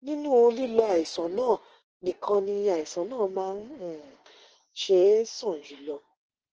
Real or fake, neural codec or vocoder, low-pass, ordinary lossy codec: fake; autoencoder, 48 kHz, 32 numbers a frame, DAC-VAE, trained on Japanese speech; 7.2 kHz; Opus, 16 kbps